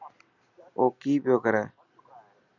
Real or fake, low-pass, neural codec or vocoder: fake; 7.2 kHz; vocoder, 44.1 kHz, 128 mel bands every 256 samples, BigVGAN v2